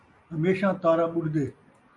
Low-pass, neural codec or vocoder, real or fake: 10.8 kHz; none; real